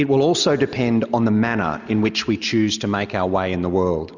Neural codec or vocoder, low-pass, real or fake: none; 7.2 kHz; real